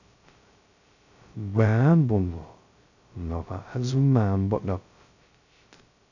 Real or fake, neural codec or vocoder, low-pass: fake; codec, 16 kHz, 0.2 kbps, FocalCodec; 7.2 kHz